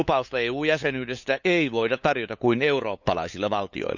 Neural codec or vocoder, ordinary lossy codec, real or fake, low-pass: codec, 16 kHz, 8 kbps, FunCodec, trained on LibriTTS, 25 frames a second; none; fake; 7.2 kHz